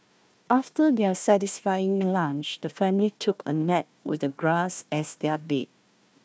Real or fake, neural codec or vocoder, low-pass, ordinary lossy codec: fake; codec, 16 kHz, 1 kbps, FunCodec, trained on Chinese and English, 50 frames a second; none; none